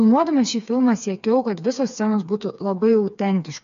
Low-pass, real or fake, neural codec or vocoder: 7.2 kHz; fake; codec, 16 kHz, 4 kbps, FreqCodec, smaller model